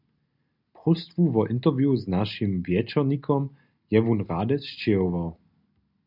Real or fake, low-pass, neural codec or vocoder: real; 5.4 kHz; none